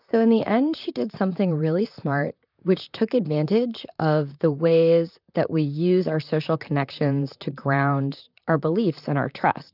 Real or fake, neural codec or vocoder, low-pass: fake; vocoder, 44.1 kHz, 128 mel bands, Pupu-Vocoder; 5.4 kHz